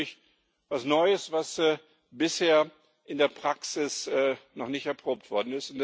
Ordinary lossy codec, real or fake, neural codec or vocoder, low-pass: none; real; none; none